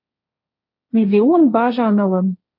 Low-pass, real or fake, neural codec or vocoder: 5.4 kHz; fake; codec, 16 kHz, 1.1 kbps, Voila-Tokenizer